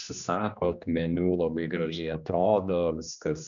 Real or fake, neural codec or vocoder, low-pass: fake; codec, 16 kHz, 2 kbps, X-Codec, HuBERT features, trained on general audio; 7.2 kHz